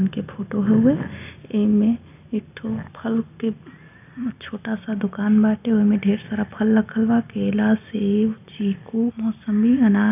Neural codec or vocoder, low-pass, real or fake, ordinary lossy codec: none; 3.6 kHz; real; none